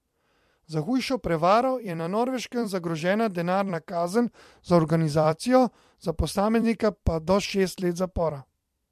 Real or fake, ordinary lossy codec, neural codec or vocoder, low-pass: fake; MP3, 64 kbps; vocoder, 44.1 kHz, 128 mel bands every 256 samples, BigVGAN v2; 14.4 kHz